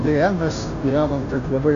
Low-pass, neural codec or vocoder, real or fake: 7.2 kHz; codec, 16 kHz, 0.5 kbps, FunCodec, trained on Chinese and English, 25 frames a second; fake